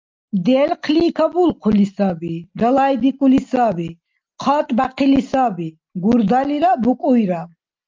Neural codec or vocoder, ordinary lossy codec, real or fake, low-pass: none; Opus, 24 kbps; real; 7.2 kHz